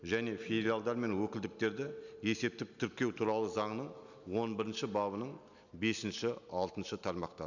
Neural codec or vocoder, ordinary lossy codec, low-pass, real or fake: none; none; 7.2 kHz; real